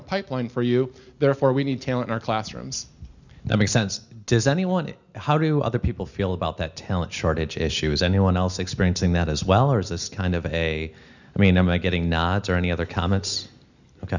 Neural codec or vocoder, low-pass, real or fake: none; 7.2 kHz; real